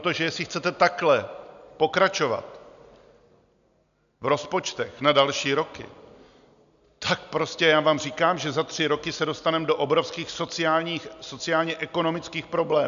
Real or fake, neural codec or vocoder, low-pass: real; none; 7.2 kHz